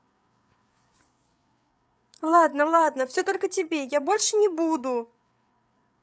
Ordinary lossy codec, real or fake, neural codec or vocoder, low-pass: none; fake; codec, 16 kHz, 8 kbps, FreqCodec, larger model; none